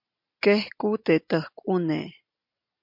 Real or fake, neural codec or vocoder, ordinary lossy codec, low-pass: real; none; MP3, 48 kbps; 5.4 kHz